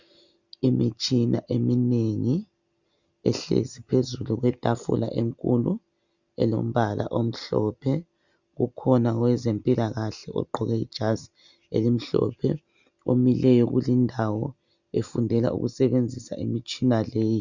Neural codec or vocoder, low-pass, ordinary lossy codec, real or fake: none; 7.2 kHz; Opus, 64 kbps; real